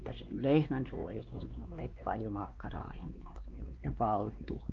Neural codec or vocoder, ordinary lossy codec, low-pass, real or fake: codec, 16 kHz, 2 kbps, X-Codec, WavLM features, trained on Multilingual LibriSpeech; Opus, 32 kbps; 7.2 kHz; fake